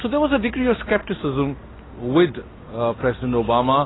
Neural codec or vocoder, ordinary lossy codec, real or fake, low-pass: none; AAC, 16 kbps; real; 7.2 kHz